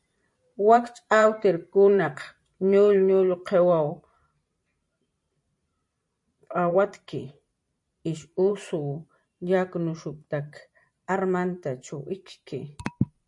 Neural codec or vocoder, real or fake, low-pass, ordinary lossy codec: vocoder, 44.1 kHz, 128 mel bands every 512 samples, BigVGAN v2; fake; 10.8 kHz; MP3, 48 kbps